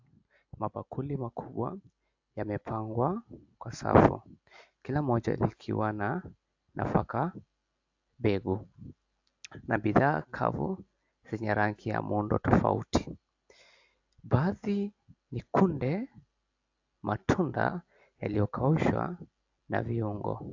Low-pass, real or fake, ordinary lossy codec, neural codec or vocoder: 7.2 kHz; real; AAC, 48 kbps; none